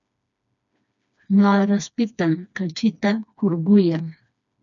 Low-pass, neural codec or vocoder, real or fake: 7.2 kHz; codec, 16 kHz, 2 kbps, FreqCodec, smaller model; fake